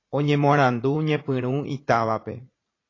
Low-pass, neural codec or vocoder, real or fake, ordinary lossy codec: 7.2 kHz; vocoder, 44.1 kHz, 80 mel bands, Vocos; fake; AAC, 32 kbps